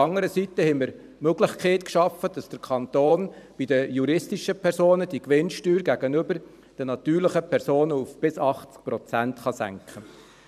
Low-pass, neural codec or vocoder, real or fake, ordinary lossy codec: 14.4 kHz; vocoder, 44.1 kHz, 128 mel bands every 512 samples, BigVGAN v2; fake; none